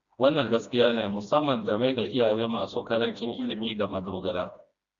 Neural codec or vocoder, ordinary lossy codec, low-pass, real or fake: codec, 16 kHz, 1 kbps, FreqCodec, smaller model; Opus, 64 kbps; 7.2 kHz; fake